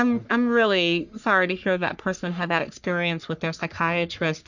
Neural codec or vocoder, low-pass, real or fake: codec, 44.1 kHz, 3.4 kbps, Pupu-Codec; 7.2 kHz; fake